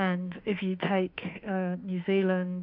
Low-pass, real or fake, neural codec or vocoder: 5.4 kHz; fake; autoencoder, 48 kHz, 32 numbers a frame, DAC-VAE, trained on Japanese speech